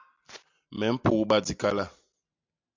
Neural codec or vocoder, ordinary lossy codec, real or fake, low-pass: none; MP3, 64 kbps; real; 7.2 kHz